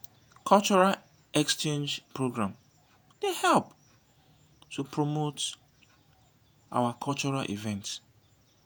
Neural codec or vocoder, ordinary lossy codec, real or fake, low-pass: vocoder, 48 kHz, 128 mel bands, Vocos; none; fake; none